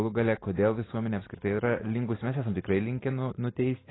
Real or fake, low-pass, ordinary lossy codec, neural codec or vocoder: real; 7.2 kHz; AAC, 16 kbps; none